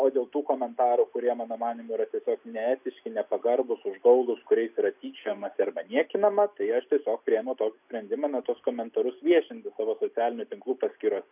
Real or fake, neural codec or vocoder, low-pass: real; none; 3.6 kHz